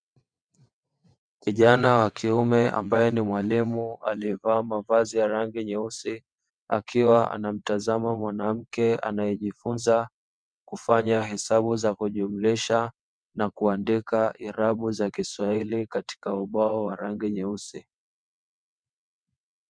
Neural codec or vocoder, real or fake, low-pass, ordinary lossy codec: vocoder, 22.05 kHz, 80 mel bands, WaveNeXt; fake; 9.9 kHz; MP3, 96 kbps